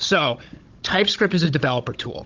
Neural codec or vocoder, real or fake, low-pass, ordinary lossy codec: codec, 16 kHz, 16 kbps, FunCodec, trained on Chinese and English, 50 frames a second; fake; 7.2 kHz; Opus, 32 kbps